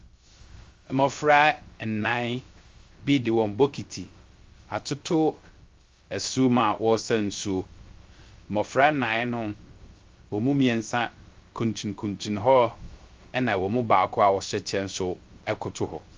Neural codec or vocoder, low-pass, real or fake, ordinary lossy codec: codec, 16 kHz, 0.3 kbps, FocalCodec; 7.2 kHz; fake; Opus, 32 kbps